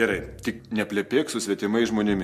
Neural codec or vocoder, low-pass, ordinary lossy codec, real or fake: none; 14.4 kHz; MP3, 64 kbps; real